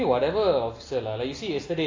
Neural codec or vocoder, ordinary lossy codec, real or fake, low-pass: none; AAC, 32 kbps; real; 7.2 kHz